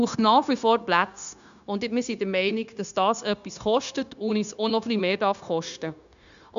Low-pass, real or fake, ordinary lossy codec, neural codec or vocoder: 7.2 kHz; fake; none; codec, 16 kHz, 0.9 kbps, LongCat-Audio-Codec